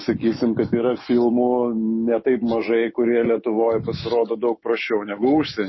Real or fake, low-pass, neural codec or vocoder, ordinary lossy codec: fake; 7.2 kHz; autoencoder, 48 kHz, 128 numbers a frame, DAC-VAE, trained on Japanese speech; MP3, 24 kbps